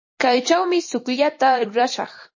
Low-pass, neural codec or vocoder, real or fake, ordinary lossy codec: 7.2 kHz; vocoder, 44.1 kHz, 128 mel bands every 256 samples, BigVGAN v2; fake; MP3, 32 kbps